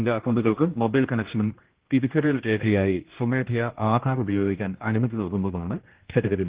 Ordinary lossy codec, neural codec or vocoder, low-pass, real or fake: Opus, 16 kbps; codec, 16 kHz, 1 kbps, X-Codec, HuBERT features, trained on general audio; 3.6 kHz; fake